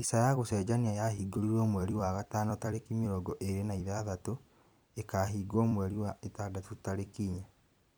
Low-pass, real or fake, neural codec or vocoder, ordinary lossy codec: none; fake; vocoder, 44.1 kHz, 128 mel bands every 256 samples, BigVGAN v2; none